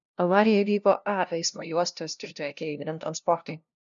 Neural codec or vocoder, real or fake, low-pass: codec, 16 kHz, 0.5 kbps, FunCodec, trained on LibriTTS, 25 frames a second; fake; 7.2 kHz